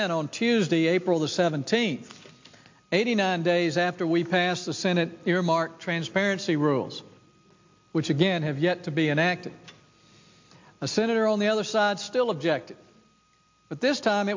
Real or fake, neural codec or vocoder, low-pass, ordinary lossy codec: real; none; 7.2 kHz; MP3, 48 kbps